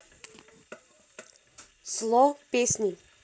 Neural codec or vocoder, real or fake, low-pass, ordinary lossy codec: none; real; none; none